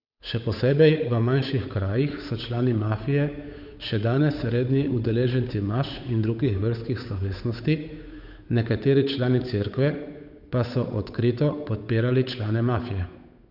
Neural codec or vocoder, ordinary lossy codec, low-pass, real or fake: codec, 16 kHz, 8 kbps, FunCodec, trained on Chinese and English, 25 frames a second; none; 5.4 kHz; fake